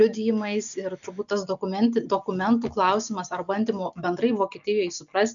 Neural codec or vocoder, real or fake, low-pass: none; real; 7.2 kHz